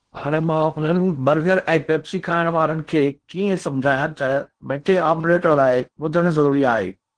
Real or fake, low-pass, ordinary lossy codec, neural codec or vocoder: fake; 9.9 kHz; Opus, 16 kbps; codec, 16 kHz in and 24 kHz out, 0.6 kbps, FocalCodec, streaming, 2048 codes